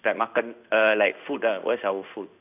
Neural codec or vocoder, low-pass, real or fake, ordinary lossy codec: codec, 16 kHz in and 24 kHz out, 1 kbps, XY-Tokenizer; 3.6 kHz; fake; none